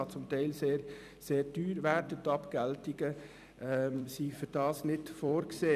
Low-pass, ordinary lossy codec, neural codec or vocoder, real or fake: 14.4 kHz; none; vocoder, 44.1 kHz, 128 mel bands every 256 samples, BigVGAN v2; fake